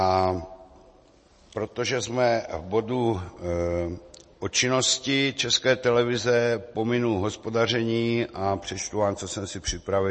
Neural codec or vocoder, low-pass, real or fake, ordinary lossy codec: none; 10.8 kHz; real; MP3, 32 kbps